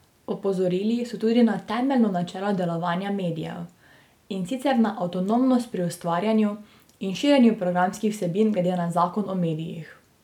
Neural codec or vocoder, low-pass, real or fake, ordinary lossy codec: none; 19.8 kHz; real; none